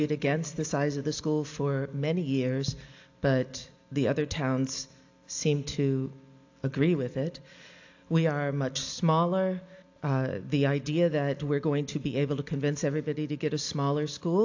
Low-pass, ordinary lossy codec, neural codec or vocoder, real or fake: 7.2 kHz; AAC, 48 kbps; none; real